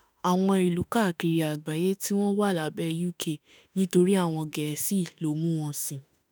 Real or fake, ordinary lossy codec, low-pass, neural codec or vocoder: fake; none; none; autoencoder, 48 kHz, 32 numbers a frame, DAC-VAE, trained on Japanese speech